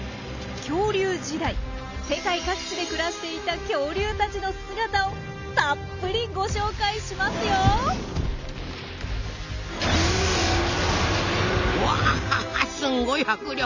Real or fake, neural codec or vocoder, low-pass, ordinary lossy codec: real; none; 7.2 kHz; none